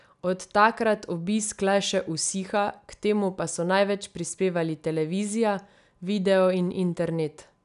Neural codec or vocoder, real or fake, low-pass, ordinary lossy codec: none; real; 10.8 kHz; none